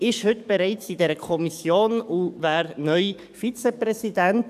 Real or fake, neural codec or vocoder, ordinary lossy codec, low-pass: fake; codec, 44.1 kHz, 7.8 kbps, DAC; none; 14.4 kHz